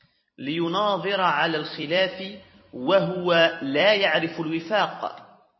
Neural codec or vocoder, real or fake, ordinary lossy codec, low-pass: vocoder, 44.1 kHz, 128 mel bands every 256 samples, BigVGAN v2; fake; MP3, 24 kbps; 7.2 kHz